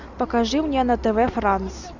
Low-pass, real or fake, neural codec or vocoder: 7.2 kHz; real; none